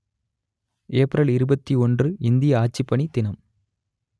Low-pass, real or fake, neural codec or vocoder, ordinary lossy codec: none; real; none; none